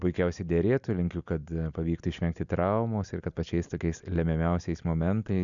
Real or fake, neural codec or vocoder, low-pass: real; none; 7.2 kHz